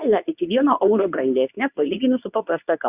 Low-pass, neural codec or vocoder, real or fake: 3.6 kHz; codec, 24 kHz, 0.9 kbps, WavTokenizer, medium speech release version 2; fake